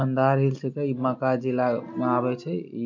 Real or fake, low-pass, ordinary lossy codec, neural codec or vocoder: real; 7.2 kHz; MP3, 48 kbps; none